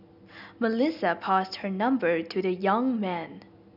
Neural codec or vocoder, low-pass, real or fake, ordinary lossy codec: none; 5.4 kHz; real; none